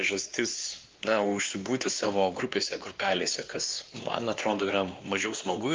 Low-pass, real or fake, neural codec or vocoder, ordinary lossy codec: 7.2 kHz; fake; codec, 16 kHz, 2 kbps, X-Codec, WavLM features, trained on Multilingual LibriSpeech; Opus, 24 kbps